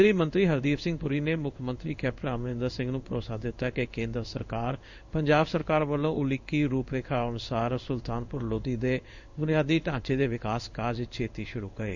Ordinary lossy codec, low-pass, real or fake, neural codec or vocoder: none; 7.2 kHz; fake; codec, 16 kHz in and 24 kHz out, 1 kbps, XY-Tokenizer